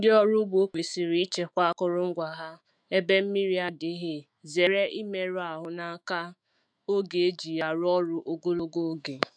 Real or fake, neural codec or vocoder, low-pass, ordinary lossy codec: fake; autoencoder, 48 kHz, 128 numbers a frame, DAC-VAE, trained on Japanese speech; 9.9 kHz; none